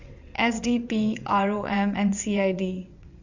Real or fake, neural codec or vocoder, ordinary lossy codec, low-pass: fake; vocoder, 22.05 kHz, 80 mel bands, WaveNeXt; Opus, 64 kbps; 7.2 kHz